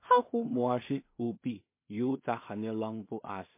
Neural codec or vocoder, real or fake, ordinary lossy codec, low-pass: codec, 16 kHz in and 24 kHz out, 0.4 kbps, LongCat-Audio-Codec, two codebook decoder; fake; MP3, 24 kbps; 3.6 kHz